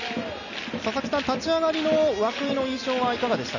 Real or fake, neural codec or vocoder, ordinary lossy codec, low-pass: real; none; none; 7.2 kHz